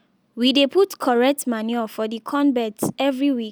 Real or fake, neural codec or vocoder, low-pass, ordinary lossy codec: real; none; none; none